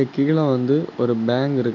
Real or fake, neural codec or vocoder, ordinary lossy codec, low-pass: real; none; none; 7.2 kHz